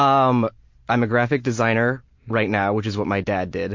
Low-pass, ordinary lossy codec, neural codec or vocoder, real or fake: 7.2 kHz; MP3, 48 kbps; none; real